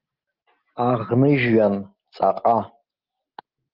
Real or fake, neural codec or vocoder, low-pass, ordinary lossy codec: real; none; 5.4 kHz; Opus, 32 kbps